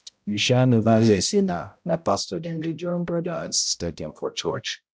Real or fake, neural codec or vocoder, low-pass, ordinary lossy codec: fake; codec, 16 kHz, 0.5 kbps, X-Codec, HuBERT features, trained on balanced general audio; none; none